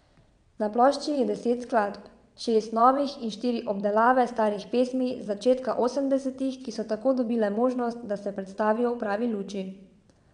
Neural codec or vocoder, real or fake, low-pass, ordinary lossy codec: vocoder, 22.05 kHz, 80 mel bands, WaveNeXt; fake; 9.9 kHz; none